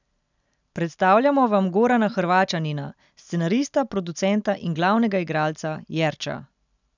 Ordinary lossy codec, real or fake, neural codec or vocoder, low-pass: none; real; none; 7.2 kHz